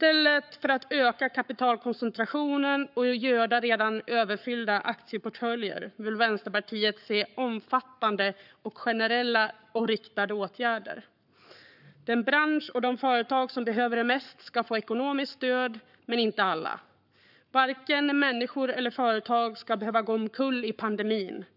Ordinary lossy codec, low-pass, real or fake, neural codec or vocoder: none; 5.4 kHz; fake; codec, 44.1 kHz, 7.8 kbps, Pupu-Codec